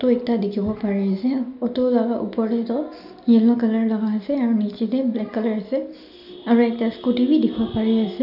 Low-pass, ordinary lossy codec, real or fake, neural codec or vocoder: 5.4 kHz; none; real; none